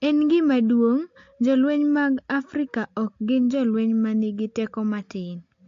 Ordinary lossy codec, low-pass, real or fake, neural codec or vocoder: AAC, 48 kbps; 7.2 kHz; real; none